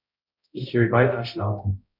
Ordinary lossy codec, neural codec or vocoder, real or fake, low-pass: none; codec, 16 kHz, 0.5 kbps, X-Codec, HuBERT features, trained on balanced general audio; fake; 5.4 kHz